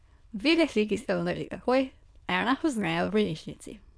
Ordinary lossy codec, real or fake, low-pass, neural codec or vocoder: none; fake; none; autoencoder, 22.05 kHz, a latent of 192 numbers a frame, VITS, trained on many speakers